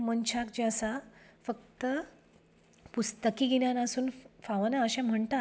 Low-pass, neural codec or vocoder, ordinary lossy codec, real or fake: none; none; none; real